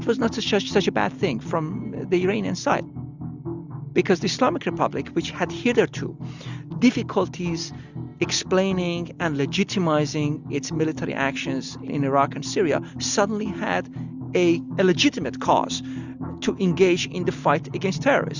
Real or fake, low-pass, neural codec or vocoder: real; 7.2 kHz; none